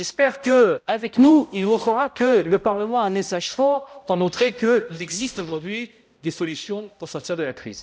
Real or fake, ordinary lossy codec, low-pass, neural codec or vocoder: fake; none; none; codec, 16 kHz, 0.5 kbps, X-Codec, HuBERT features, trained on balanced general audio